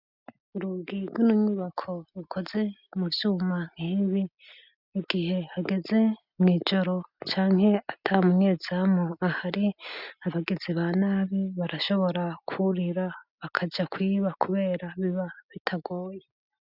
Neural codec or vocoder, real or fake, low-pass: none; real; 5.4 kHz